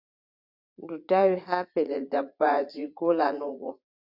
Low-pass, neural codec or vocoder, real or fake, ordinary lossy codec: 5.4 kHz; vocoder, 44.1 kHz, 80 mel bands, Vocos; fake; Opus, 64 kbps